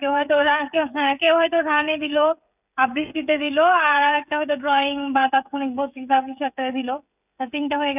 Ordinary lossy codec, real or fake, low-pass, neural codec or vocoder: none; fake; 3.6 kHz; codec, 16 kHz, 16 kbps, FreqCodec, smaller model